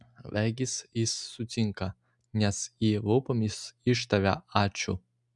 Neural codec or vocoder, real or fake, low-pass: none; real; 10.8 kHz